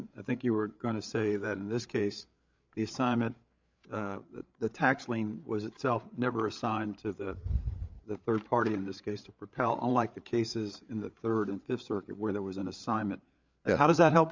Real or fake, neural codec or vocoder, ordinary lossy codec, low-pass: fake; codec, 16 kHz, 8 kbps, FreqCodec, larger model; MP3, 64 kbps; 7.2 kHz